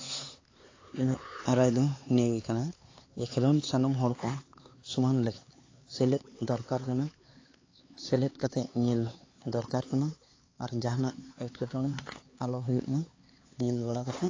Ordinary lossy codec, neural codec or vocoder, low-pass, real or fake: AAC, 32 kbps; codec, 16 kHz, 4 kbps, X-Codec, HuBERT features, trained on LibriSpeech; 7.2 kHz; fake